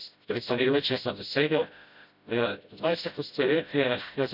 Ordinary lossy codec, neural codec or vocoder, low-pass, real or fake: none; codec, 16 kHz, 0.5 kbps, FreqCodec, smaller model; 5.4 kHz; fake